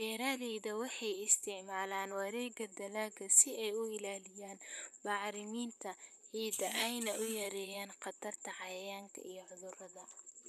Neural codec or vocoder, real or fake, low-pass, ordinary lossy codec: vocoder, 44.1 kHz, 128 mel bands, Pupu-Vocoder; fake; 14.4 kHz; none